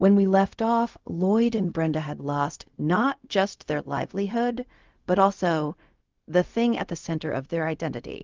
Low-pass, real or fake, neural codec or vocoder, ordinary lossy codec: 7.2 kHz; fake; codec, 16 kHz, 0.4 kbps, LongCat-Audio-Codec; Opus, 32 kbps